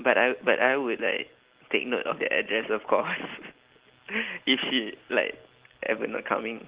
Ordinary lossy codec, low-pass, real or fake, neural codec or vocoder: Opus, 16 kbps; 3.6 kHz; real; none